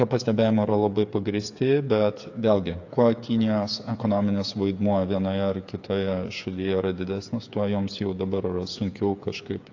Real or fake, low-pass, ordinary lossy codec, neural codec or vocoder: fake; 7.2 kHz; AAC, 48 kbps; codec, 16 kHz, 8 kbps, FreqCodec, smaller model